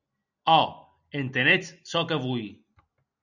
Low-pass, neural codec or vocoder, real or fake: 7.2 kHz; none; real